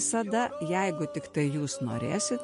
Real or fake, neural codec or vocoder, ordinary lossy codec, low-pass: real; none; MP3, 48 kbps; 10.8 kHz